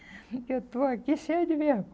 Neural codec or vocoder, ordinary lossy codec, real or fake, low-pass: none; none; real; none